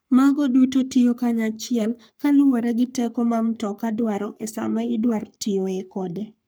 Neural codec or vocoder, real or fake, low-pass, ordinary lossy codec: codec, 44.1 kHz, 3.4 kbps, Pupu-Codec; fake; none; none